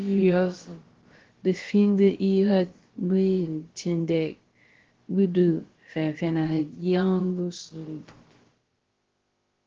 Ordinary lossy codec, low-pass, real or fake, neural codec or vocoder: Opus, 16 kbps; 7.2 kHz; fake; codec, 16 kHz, about 1 kbps, DyCAST, with the encoder's durations